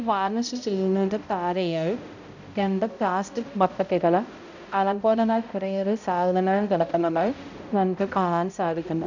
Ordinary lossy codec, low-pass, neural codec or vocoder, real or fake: none; 7.2 kHz; codec, 16 kHz, 0.5 kbps, X-Codec, HuBERT features, trained on balanced general audio; fake